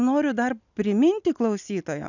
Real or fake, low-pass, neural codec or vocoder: real; 7.2 kHz; none